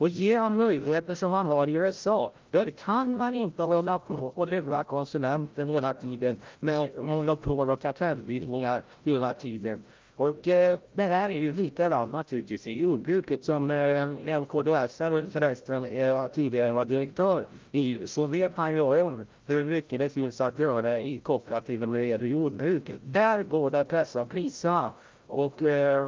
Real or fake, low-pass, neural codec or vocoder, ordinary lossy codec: fake; 7.2 kHz; codec, 16 kHz, 0.5 kbps, FreqCodec, larger model; Opus, 32 kbps